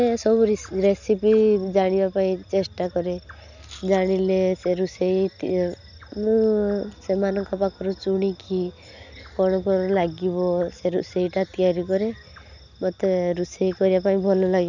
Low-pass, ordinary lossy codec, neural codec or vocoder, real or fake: 7.2 kHz; none; none; real